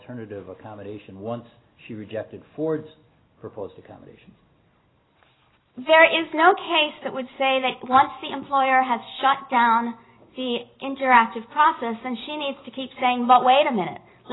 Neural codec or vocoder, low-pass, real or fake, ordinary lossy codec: none; 7.2 kHz; real; AAC, 16 kbps